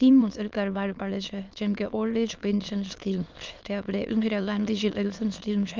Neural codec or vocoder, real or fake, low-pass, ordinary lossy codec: autoencoder, 22.05 kHz, a latent of 192 numbers a frame, VITS, trained on many speakers; fake; 7.2 kHz; Opus, 24 kbps